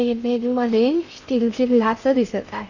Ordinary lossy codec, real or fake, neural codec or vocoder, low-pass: none; fake; codec, 16 kHz in and 24 kHz out, 0.8 kbps, FocalCodec, streaming, 65536 codes; 7.2 kHz